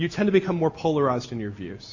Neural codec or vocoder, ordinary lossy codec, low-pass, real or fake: none; MP3, 32 kbps; 7.2 kHz; real